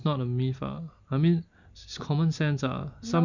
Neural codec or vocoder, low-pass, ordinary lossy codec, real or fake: none; 7.2 kHz; none; real